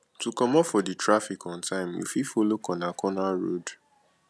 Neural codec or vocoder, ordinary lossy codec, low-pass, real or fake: none; none; none; real